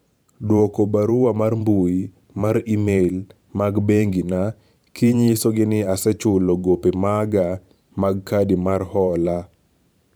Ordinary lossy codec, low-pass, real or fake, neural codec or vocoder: none; none; fake; vocoder, 44.1 kHz, 128 mel bands every 512 samples, BigVGAN v2